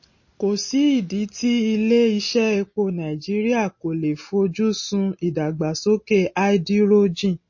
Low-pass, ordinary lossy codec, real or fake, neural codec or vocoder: 7.2 kHz; MP3, 32 kbps; real; none